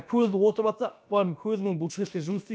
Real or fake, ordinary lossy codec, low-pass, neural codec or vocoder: fake; none; none; codec, 16 kHz, about 1 kbps, DyCAST, with the encoder's durations